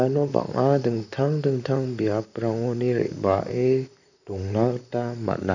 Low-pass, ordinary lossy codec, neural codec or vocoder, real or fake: 7.2 kHz; none; vocoder, 44.1 kHz, 128 mel bands, Pupu-Vocoder; fake